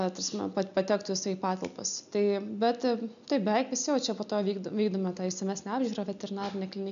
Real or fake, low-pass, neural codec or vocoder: real; 7.2 kHz; none